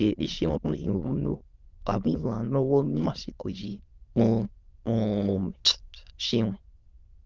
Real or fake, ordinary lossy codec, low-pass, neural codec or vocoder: fake; Opus, 32 kbps; 7.2 kHz; autoencoder, 22.05 kHz, a latent of 192 numbers a frame, VITS, trained on many speakers